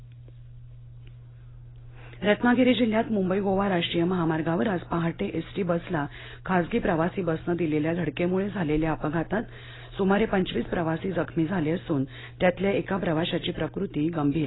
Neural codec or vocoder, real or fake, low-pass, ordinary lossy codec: none; real; 7.2 kHz; AAC, 16 kbps